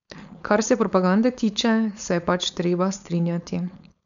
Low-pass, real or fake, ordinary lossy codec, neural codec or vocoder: 7.2 kHz; fake; none; codec, 16 kHz, 4.8 kbps, FACodec